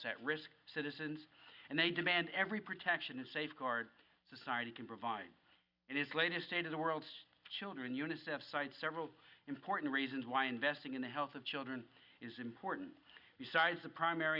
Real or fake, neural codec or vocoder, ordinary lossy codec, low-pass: real; none; Opus, 64 kbps; 5.4 kHz